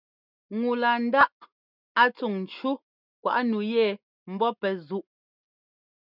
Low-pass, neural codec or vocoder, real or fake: 5.4 kHz; none; real